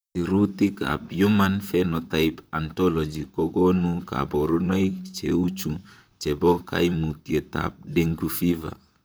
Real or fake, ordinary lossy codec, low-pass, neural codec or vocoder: fake; none; none; vocoder, 44.1 kHz, 128 mel bands, Pupu-Vocoder